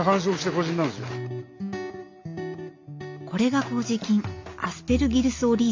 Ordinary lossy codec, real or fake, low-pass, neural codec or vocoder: MP3, 48 kbps; real; 7.2 kHz; none